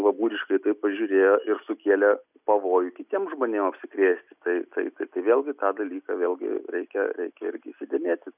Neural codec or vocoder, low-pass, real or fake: none; 3.6 kHz; real